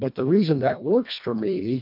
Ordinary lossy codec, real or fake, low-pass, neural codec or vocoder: MP3, 48 kbps; fake; 5.4 kHz; codec, 24 kHz, 1.5 kbps, HILCodec